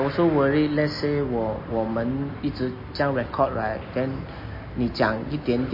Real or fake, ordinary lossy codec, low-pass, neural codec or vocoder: real; MP3, 24 kbps; 5.4 kHz; none